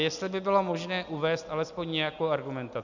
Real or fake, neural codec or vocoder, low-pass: real; none; 7.2 kHz